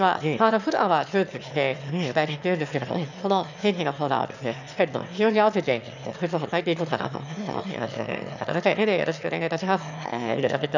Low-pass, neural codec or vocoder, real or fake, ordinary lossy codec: 7.2 kHz; autoencoder, 22.05 kHz, a latent of 192 numbers a frame, VITS, trained on one speaker; fake; none